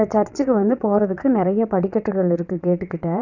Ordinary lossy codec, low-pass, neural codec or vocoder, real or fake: none; 7.2 kHz; vocoder, 22.05 kHz, 80 mel bands, WaveNeXt; fake